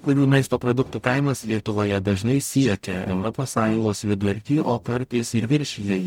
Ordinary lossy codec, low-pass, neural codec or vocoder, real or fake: MP3, 96 kbps; 19.8 kHz; codec, 44.1 kHz, 0.9 kbps, DAC; fake